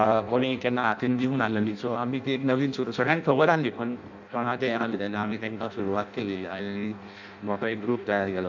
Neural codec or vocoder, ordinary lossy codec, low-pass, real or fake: codec, 16 kHz in and 24 kHz out, 0.6 kbps, FireRedTTS-2 codec; none; 7.2 kHz; fake